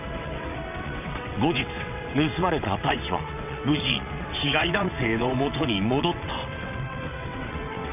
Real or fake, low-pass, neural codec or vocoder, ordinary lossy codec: fake; 3.6 kHz; vocoder, 22.05 kHz, 80 mel bands, WaveNeXt; none